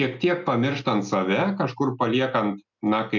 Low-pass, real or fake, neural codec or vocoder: 7.2 kHz; real; none